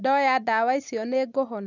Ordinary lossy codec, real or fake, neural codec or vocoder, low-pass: none; real; none; 7.2 kHz